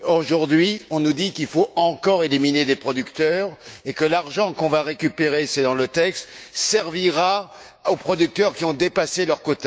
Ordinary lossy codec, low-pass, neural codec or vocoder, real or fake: none; none; codec, 16 kHz, 6 kbps, DAC; fake